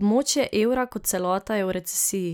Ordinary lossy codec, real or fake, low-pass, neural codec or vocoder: none; real; none; none